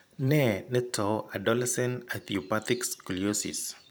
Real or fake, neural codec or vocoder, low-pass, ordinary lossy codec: fake; vocoder, 44.1 kHz, 128 mel bands every 512 samples, BigVGAN v2; none; none